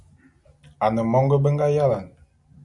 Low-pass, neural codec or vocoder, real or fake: 10.8 kHz; none; real